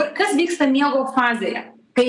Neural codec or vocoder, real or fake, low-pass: none; real; 10.8 kHz